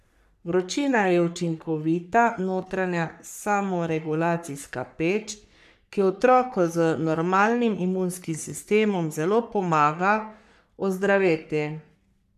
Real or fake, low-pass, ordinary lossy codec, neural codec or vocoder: fake; 14.4 kHz; none; codec, 44.1 kHz, 3.4 kbps, Pupu-Codec